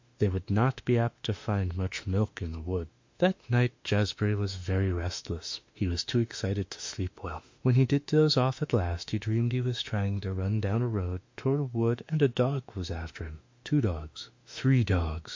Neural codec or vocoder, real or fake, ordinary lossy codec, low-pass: autoencoder, 48 kHz, 32 numbers a frame, DAC-VAE, trained on Japanese speech; fake; MP3, 48 kbps; 7.2 kHz